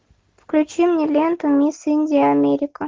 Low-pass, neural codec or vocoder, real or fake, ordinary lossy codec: 7.2 kHz; none; real; Opus, 16 kbps